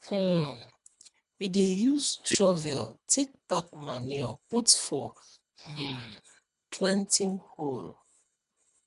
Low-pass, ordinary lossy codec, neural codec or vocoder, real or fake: 10.8 kHz; none; codec, 24 kHz, 1.5 kbps, HILCodec; fake